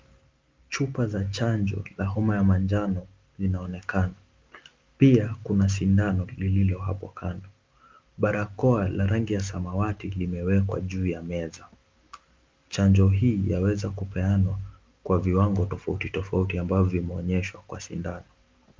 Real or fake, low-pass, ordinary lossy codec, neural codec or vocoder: real; 7.2 kHz; Opus, 24 kbps; none